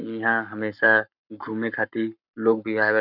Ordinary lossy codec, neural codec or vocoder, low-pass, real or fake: none; codec, 44.1 kHz, 7.8 kbps, Pupu-Codec; 5.4 kHz; fake